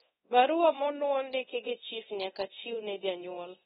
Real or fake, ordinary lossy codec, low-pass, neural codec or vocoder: fake; AAC, 16 kbps; 10.8 kHz; codec, 24 kHz, 0.9 kbps, DualCodec